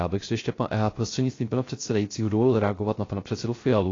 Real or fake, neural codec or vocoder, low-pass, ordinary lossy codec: fake; codec, 16 kHz, 0.3 kbps, FocalCodec; 7.2 kHz; AAC, 32 kbps